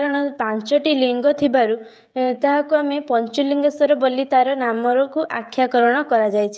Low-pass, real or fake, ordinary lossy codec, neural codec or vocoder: none; fake; none; codec, 16 kHz, 16 kbps, FreqCodec, smaller model